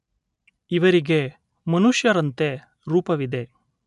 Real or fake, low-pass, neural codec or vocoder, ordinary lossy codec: real; 10.8 kHz; none; none